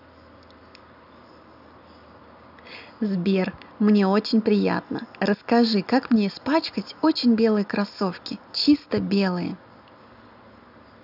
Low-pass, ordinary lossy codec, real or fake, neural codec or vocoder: 5.4 kHz; none; real; none